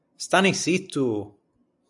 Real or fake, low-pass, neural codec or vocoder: real; 10.8 kHz; none